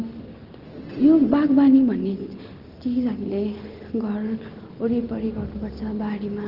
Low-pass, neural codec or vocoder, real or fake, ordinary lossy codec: 5.4 kHz; none; real; Opus, 16 kbps